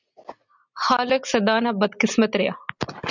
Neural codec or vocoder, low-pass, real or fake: none; 7.2 kHz; real